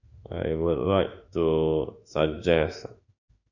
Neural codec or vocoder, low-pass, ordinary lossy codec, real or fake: autoencoder, 48 kHz, 32 numbers a frame, DAC-VAE, trained on Japanese speech; 7.2 kHz; none; fake